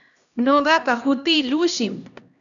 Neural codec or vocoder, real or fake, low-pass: codec, 16 kHz, 1 kbps, X-Codec, HuBERT features, trained on LibriSpeech; fake; 7.2 kHz